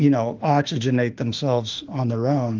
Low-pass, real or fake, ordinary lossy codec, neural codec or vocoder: 7.2 kHz; fake; Opus, 32 kbps; autoencoder, 48 kHz, 32 numbers a frame, DAC-VAE, trained on Japanese speech